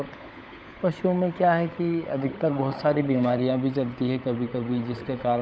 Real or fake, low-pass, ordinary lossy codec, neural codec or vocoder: fake; none; none; codec, 16 kHz, 16 kbps, FunCodec, trained on LibriTTS, 50 frames a second